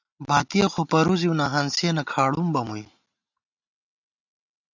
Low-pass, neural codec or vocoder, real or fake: 7.2 kHz; none; real